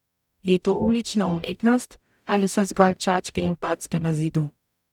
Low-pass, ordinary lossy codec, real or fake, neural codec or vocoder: 19.8 kHz; none; fake; codec, 44.1 kHz, 0.9 kbps, DAC